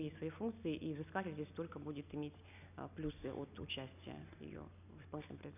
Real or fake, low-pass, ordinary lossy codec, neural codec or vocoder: real; 3.6 kHz; none; none